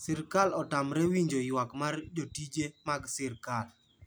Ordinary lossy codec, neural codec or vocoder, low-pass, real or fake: none; none; none; real